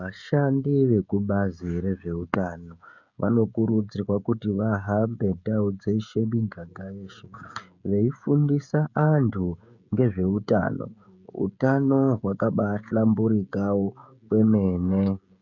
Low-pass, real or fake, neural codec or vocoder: 7.2 kHz; fake; codec, 16 kHz, 16 kbps, FreqCodec, smaller model